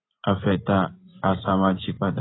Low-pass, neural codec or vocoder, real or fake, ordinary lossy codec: 7.2 kHz; vocoder, 24 kHz, 100 mel bands, Vocos; fake; AAC, 16 kbps